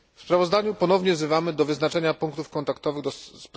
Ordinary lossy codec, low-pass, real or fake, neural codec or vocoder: none; none; real; none